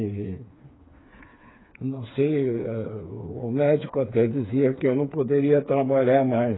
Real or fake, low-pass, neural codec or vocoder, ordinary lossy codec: fake; 7.2 kHz; codec, 16 kHz, 4 kbps, FreqCodec, smaller model; AAC, 16 kbps